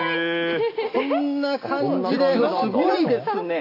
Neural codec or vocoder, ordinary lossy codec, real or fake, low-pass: none; AAC, 32 kbps; real; 5.4 kHz